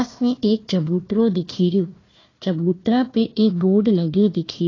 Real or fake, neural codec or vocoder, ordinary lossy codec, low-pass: fake; codec, 16 kHz, 1 kbps, FunCodec, trained on Chinese and English, 50 frames a second; AAC, 32 kbps; 7.2 kHz